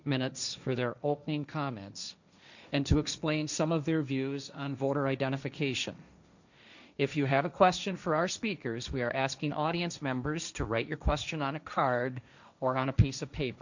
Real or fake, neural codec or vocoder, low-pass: fake; codec, 16 kHz, 1.1 kbps, Voila-Tokenizer; 7.2 kHz